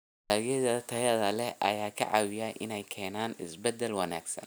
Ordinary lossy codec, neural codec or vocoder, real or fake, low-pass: none; none; real; none